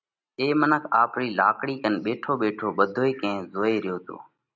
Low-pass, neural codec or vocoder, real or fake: 7.2 kHz; none; real